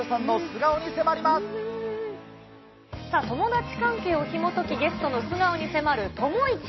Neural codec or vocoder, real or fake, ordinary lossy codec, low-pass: none; real; MP3, 24 kbps; 7.2 kHz